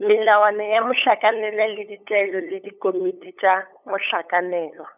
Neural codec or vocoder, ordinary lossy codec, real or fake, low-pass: codec, 16 kHz, 8 kbps, FunCodec, trained on LibriTTS, 25 frames a second; none; fake; 3.6 kHz